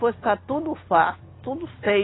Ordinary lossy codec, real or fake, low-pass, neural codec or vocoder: AAC, 16 kbps; real; 7.2 kHz; none